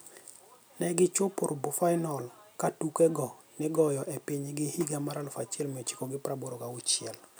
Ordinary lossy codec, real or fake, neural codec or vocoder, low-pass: none; real; none; none